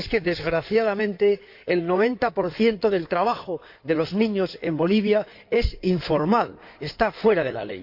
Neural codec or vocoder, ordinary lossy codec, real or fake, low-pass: codec, 16 kHz in and 24 kHz out, 2.2 kbps, FireRedTTS-2 codec; MP3, 48 kbps; fake; 5.4 kHz